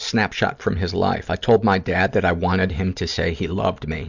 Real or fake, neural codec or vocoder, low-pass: real; none; 7.2 kHz